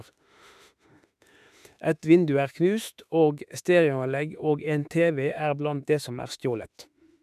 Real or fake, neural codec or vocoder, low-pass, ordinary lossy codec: fake; autoencoder, 48 kHz, 32 numbers a frame, DAC-VAE, trained on Japanese speech; 14.4 kHz; none